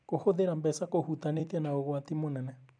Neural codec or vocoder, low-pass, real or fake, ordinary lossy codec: vocoder, 44.1 kHz, 128 mel bands every 256 samples, BigVGAN v2; 9.9 kHz; fake; none